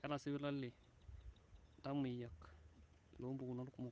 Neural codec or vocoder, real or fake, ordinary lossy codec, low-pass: codec, 16 kHz, 8 kbps, FunCodec, trained on Chinese and English, 25 frames a second; fake; none; none